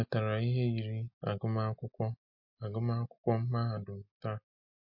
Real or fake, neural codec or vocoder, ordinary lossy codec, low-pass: real; none; MP3, 32 kbps; 5.4 kHz